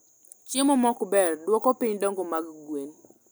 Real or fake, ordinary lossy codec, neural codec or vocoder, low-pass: real; none; none; none